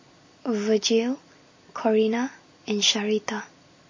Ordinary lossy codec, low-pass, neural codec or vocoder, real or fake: MP3, 32 kbps; 7.2 kHz; none; real